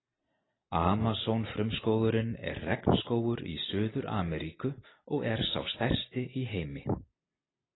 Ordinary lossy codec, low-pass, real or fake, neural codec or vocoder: AAC, 16 kbps; 7.2 kHz; real; none